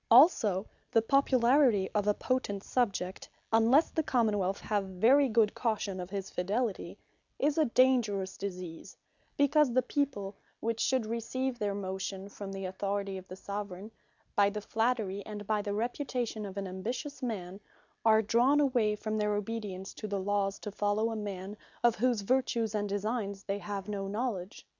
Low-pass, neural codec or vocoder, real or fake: 7.2 kHz; none; real